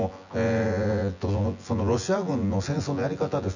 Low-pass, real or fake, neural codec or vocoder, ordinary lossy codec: 7.2 kHz; fake; vocoder, 24 kHz, 100 mel bands, Vocos; none